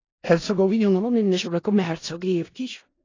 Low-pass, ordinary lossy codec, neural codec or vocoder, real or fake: 7.2 kHz; AAC, 32 kbps; codec, 16 kHz in and 24 kHz out, 0.4 kbps, LongCat-Audio-Codec, four codebook decoder; fake